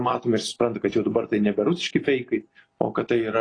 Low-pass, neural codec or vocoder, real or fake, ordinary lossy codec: 9.9 kHz; none; real; AAC, 32 kbps